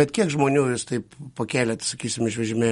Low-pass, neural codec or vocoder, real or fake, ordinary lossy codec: 19.8 kHz; none; real; MP3, 48 kbps